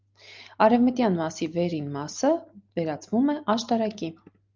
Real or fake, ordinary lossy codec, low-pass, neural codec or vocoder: real; Opus, 24 kbps; 7.2 kHz; none